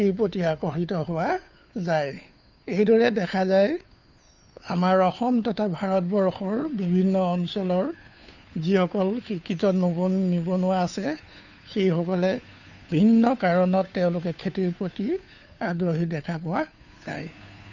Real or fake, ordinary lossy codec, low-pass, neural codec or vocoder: fake; none; 7.2 kHz; codec, 16 kHz, 2 kbps, FunCodec, trained on Chinese and English, 25 frames a second